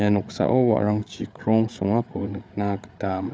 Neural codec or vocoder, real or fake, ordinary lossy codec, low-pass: codec, 16 kHz, 8 kbps, FreqCodec, larger model; fake; none; none